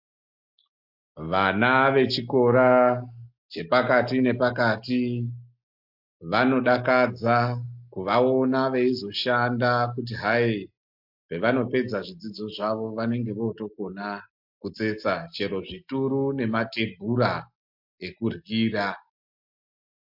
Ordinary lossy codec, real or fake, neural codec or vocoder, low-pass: MP3, 48 kbps; real; none; 5.4 kHz